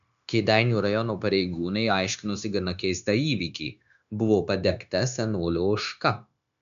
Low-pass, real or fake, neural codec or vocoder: 7.2 kHz; fake; codec, 16 kHz, 0.9 kbps, LongCat-Audio-Codec